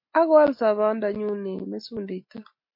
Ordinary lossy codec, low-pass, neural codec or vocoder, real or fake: MP3, 32 kbps; 5.4 kHz; none; real